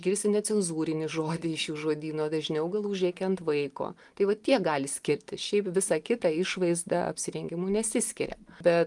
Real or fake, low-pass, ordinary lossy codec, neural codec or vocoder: real; 10.8 kHz; Opus, 32 kbps; none